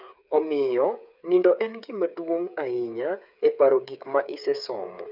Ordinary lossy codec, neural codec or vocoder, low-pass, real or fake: none; codec, 16 kHz, 8 kbps, FreqCodec, smaller model; 5.4 kHz; fake